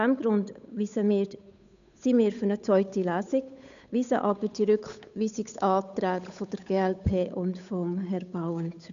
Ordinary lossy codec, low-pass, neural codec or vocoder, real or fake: none; 7.2 kHz; codec, 16 kHz, 8 kbps, FunCodec, trained on Chinese and English, 25 frames a second; fake